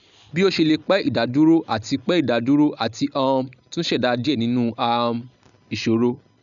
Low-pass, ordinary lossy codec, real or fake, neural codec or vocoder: 7.2 kHz; none; real; none